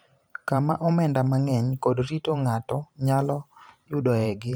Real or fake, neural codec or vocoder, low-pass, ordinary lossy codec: fake; vocoder, 44.1 kHz, 128 mel bands every 512 samples, BigVGAN v2; none; none